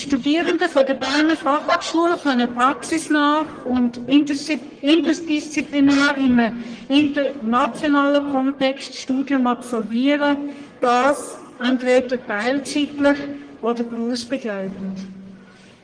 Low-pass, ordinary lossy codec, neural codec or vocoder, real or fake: 9.9 kHz; Opus, 24 kbps; codec, 44.1 kHz, 1.7 kbps, Pupu-Codec; fake